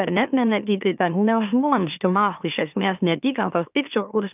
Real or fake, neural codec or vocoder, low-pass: fake; autoencoder, 44.1 kHz, a latent of 192 numbers a frame, MeloTTS; 3.6 kHz